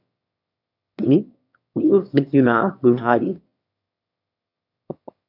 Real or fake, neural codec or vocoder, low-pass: fake; autoencoder, 22.05 kHz, a latent of 192 numbers a frame, VITS, trained on one speaker; 5.4 kHz